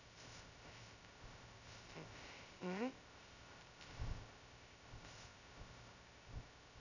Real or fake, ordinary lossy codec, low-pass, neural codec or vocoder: fake; none; 7.2 kHz; codec, 16 kHz, 0.2 kbps, FocalCodec